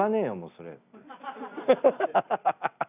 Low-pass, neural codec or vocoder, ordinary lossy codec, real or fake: 3.6 kHz; none; none; real